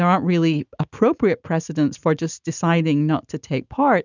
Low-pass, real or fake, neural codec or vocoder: 7.2 kHz; real; none